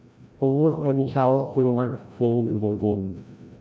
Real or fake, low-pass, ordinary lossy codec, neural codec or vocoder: fake; none; none; codec, 16 kHz, 0.5 kbps, FreqCodec, larger model